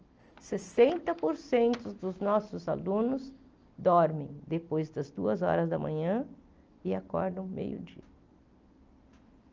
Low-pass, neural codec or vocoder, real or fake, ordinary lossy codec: 7.2 kHz; none; real; Opus, 24 kbps